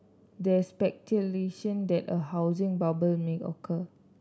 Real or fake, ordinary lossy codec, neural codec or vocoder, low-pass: real; none; none; none